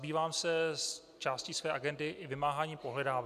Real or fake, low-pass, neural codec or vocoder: real; 14.4 kHz; none